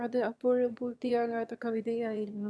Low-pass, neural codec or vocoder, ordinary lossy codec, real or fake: none; autoencoder, 22.05 kHz, a latent of 192 numbers a frame, VITS, trained on one speaker; none; fake